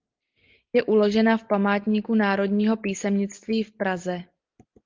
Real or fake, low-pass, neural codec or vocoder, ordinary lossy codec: real; 7.2 kHz; none; Opus, 32 kbps